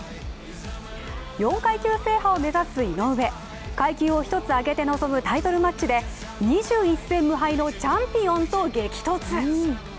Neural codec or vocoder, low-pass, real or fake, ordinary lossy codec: none; none; real; none